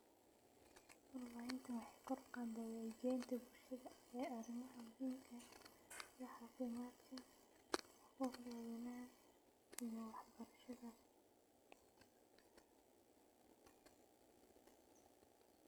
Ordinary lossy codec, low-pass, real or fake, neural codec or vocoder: none; none; real; none